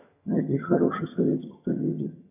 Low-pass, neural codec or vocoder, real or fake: 3.6 kHz; vocoder, 22.05 kHz, 80 mel bands, HiFi-GAN; fake